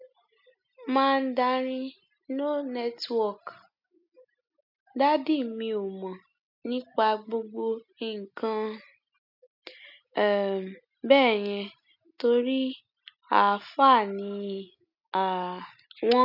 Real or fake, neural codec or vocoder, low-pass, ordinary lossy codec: real; none; 5.4 kHz; none